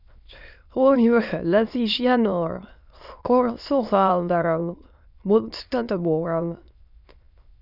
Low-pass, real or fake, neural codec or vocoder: 5.4 kHz; fake; autoencoder, 22.05 kHz, a latent of 192 numbers a frame, VITS, trained on many speakers